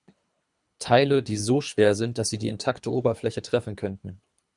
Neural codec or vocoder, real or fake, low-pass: codec, 24 kHz, 3 kbps, HILCodec; fake; 10.8 kHz